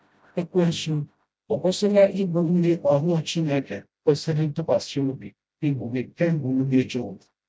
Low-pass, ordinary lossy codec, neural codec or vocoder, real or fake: none; none; codec, 16 kHz, 0.5 kbps, FreqCodec, smaller model; fake